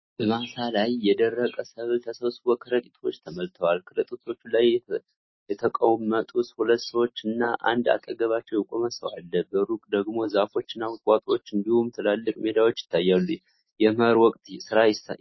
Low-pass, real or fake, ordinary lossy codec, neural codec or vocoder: 7.2 kHz; real; MP3, 24 kbps; none